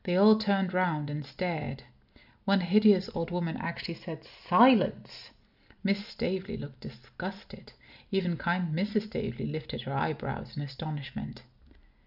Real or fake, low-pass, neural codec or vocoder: real; 5.4 kHz; none